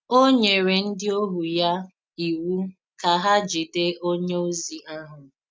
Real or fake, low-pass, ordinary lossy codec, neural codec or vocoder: real; none; none; none